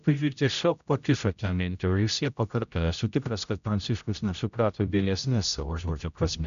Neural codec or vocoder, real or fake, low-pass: codec, 16 kHz, 0.5 kbps, X-Codec, HuBERT features, trained on general audio; fake; 7.2 kHz